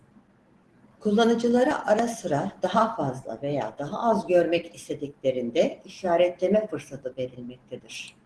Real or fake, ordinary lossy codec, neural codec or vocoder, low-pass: real; Opus, 16 kbps; none; 10.8 kHz